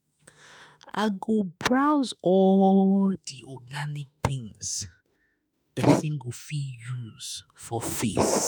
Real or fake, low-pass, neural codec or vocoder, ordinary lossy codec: fake; none; autoencoder, 48 kHz, 32 numbers a frame, DAC-VAE, trained on Japanese speech; none